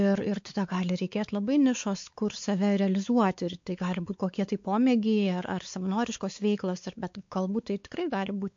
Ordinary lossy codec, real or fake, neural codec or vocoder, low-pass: MP3, 48 kbps; fake; codec, 16 kHz, 4 kbps, X-Codec, WavLM features, trained on Multilingual LibriSpeech; 7.2 kHz